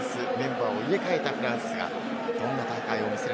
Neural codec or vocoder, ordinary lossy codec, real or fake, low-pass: none; none; real; none